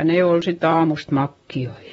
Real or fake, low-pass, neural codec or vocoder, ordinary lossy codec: fake; 19.8 kHz; vocoder, 44.1 kHz, 128 mel bands, Pupu-Vocoder; AAC, 24 kbps